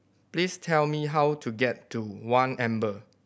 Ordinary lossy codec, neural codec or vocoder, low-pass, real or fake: none; none; none; real